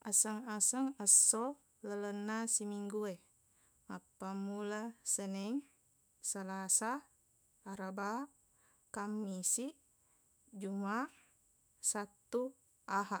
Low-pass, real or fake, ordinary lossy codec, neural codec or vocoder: none; fake; none; autoencoder, 48 kHz, 128 numbers a frame, DAC-VAE, trained on Japanese speech